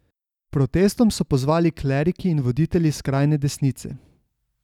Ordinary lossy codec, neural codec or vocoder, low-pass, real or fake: none; none; 19.8 kHz; real